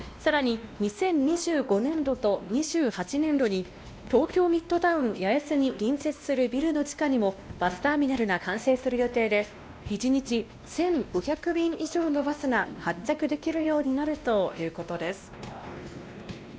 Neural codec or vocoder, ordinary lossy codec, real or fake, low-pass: codec, 16 kHz, 1 kbps, X-Codec, WavLM features, trained on Multilingual LibriSpeech; none; fake; none